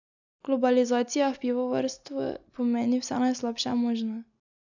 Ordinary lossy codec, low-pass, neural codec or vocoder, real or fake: none; 7.2 kHz; none; real